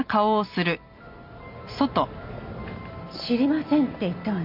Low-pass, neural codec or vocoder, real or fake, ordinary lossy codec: 5.4 kHz; none; real; MP3, 48 kbps